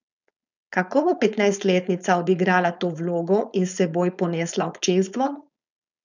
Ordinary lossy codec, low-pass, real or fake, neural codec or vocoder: none; 7.2 kHz; fake; codec, 16 kHz, 4.8 kbps, FACodec